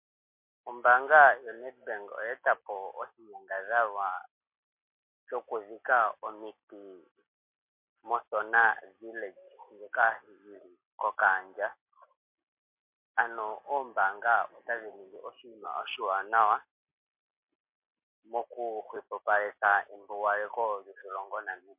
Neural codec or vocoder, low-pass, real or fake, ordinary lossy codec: none; 3.6 kHz; real; MP3, 32 kbps